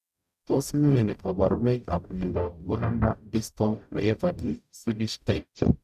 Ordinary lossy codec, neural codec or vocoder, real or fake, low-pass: none; codec, 44.1 kHz, 0.9 kbps, DAC; fake; 14.4 kHz